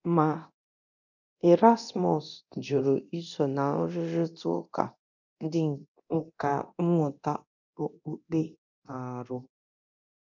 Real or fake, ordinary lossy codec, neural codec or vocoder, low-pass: fake; none; codec, 24 kHz, 0.9 kbps, DualCodec; 7.2 kHz